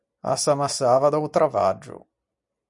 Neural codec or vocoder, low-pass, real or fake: none; 10.8 kHz; real